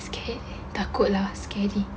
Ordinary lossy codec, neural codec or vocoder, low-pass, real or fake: none; none; none; real